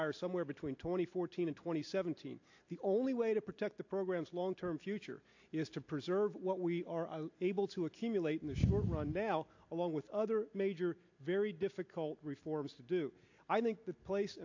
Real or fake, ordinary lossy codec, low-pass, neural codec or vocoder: real; AAC, 48 kbps; 7.2 kHz; none